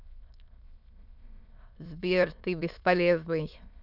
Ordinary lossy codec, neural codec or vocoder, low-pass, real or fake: none; autoencoder, 22.05 kHz, a latent of 192 numbers a frame, VITS, trained on many speakers; 5.4 kHz; fake